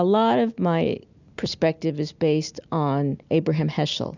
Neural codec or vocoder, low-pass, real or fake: none; 7.2 kHz; real